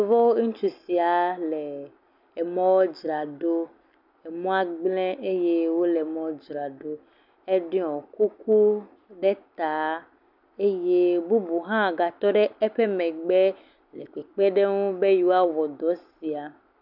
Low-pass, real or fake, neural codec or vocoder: 5.4 kHz; real; none